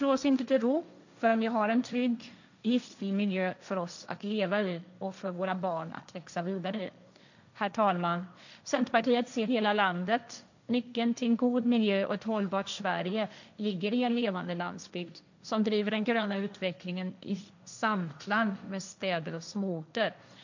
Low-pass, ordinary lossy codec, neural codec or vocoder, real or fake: 7.2 kHz; none; codec, 16 kHz, 1.1 kbps, Voila-Tokenizer; fake